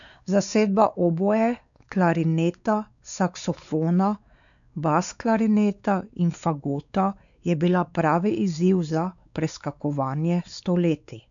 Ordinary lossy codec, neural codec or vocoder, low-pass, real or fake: none; codec, 16 kHz, 4 kbps, X-Codec, WavLM features, trained on Multilingual LibriSpeech; 7.2 kHz; fake